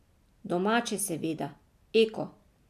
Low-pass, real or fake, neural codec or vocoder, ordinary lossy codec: 14.4 kHz; real; none; MP3, 96 kbps